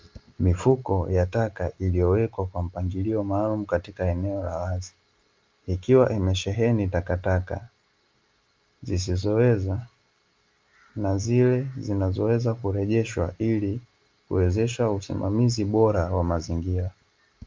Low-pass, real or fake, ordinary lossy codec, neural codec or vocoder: 7.2 kHz; real; Opus, 24 kbps; none